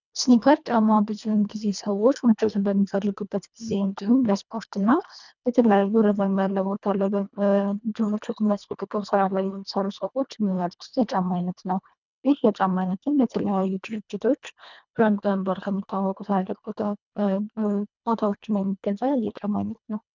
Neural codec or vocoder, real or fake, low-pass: codec, 24 kHz, 1.5 kbps, HILCodec; fake; 7.2 kHz